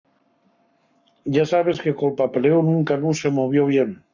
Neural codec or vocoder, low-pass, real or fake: codec, 44.1 kHz, 7.8 kbps, Pupu-Codec; 7.2 kHz; fake